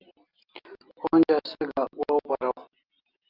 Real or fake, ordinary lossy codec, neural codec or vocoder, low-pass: real; Opus, 32 kbps; none; 5.4 kHz